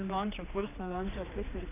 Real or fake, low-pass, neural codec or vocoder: fake; 3.6 kHz; codec, 16 kHz, 2 kbps, X-Codec, HuBERT features, trained on general audio